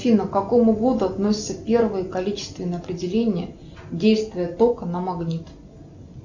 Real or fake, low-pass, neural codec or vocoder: real; 7.2 kHz; none